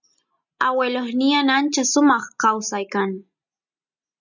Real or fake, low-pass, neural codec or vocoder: real; 7.2 kHz; none